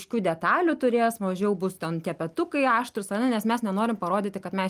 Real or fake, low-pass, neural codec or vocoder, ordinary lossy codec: real; 14.4 kHz; none; Opus, 32 kbps